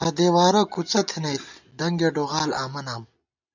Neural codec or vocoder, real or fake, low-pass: none; real; 7.2 kHz